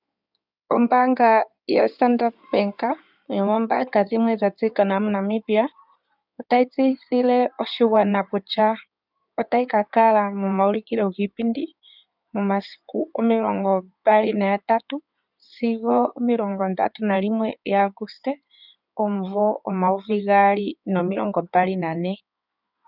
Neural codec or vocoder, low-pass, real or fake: codec, 16 kHz in and 24 kHz out, 2.2 kbps, FireRedTTS-2 codec; 5.4 kHz; fake